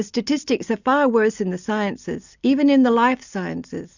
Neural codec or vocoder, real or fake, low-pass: none; real; 7.2 kHz